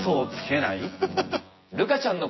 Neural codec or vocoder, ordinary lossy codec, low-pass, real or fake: vocoder, 24 kHz, 100 mel bands, Vocos; MP3, 24 kbps; 7.2 kHz; fake